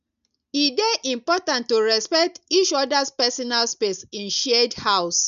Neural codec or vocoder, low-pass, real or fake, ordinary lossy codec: none; 7.2 kHz; real; none